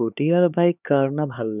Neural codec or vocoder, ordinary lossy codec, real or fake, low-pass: codec, 16 kHz, 4 kbps, X-Codec, HuBERT features, trained on LibriSpeech; none; fake; 3.6 kHz